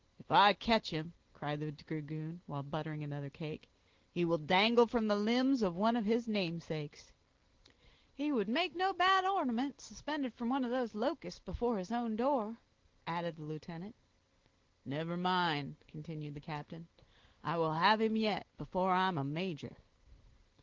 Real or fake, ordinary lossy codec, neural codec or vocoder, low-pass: real; Opus, 16 kbps; none; 7.2 kHz